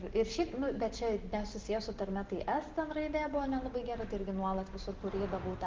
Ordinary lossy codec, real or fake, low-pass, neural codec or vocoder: Opus, 16 kbps; real; 7.2 kHz; none